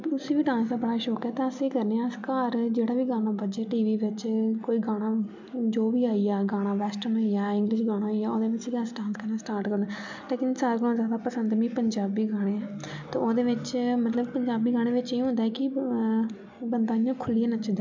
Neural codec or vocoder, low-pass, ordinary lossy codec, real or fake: none; 7.2 kHz; MP3, 64 kbps; real